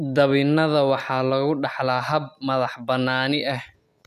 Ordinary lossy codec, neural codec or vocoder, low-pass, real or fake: none; none; 14.4 kHz; real